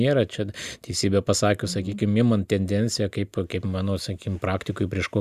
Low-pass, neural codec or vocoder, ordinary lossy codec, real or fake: 14.4 kHz; none; Opus, 64 kbps; real